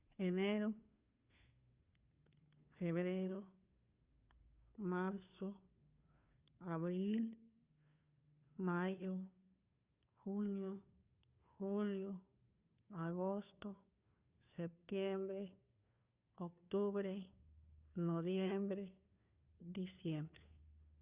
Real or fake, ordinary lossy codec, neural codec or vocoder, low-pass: fake; Opus, 64 kbps; codec, 16 kHz, 2 kbps, FunCodec, trained on Chinese and English, 25 frames a second; 3.6 kHz